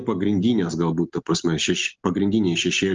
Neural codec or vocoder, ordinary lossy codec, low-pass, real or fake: none; Opus, 24 kbps; 7.2 kHz; real